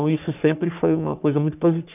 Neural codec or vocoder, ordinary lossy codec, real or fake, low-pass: codec, 44.1 kHz, 3.4 kbps, Pupu-Codec; none; fake; 3.6 kHz